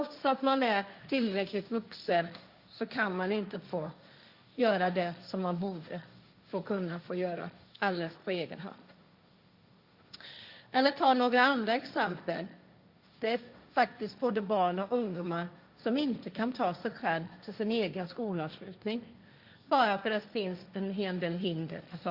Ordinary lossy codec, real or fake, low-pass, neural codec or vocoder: Opus, 64 kbps; fake; 5.4 kHz; codec, 16 kHz, 1.1 kbps, Voila-Tokenizer